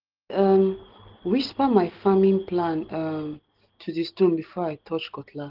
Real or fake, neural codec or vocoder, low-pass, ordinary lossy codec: real; none; 5.4 kHz; Opus, 16 kbps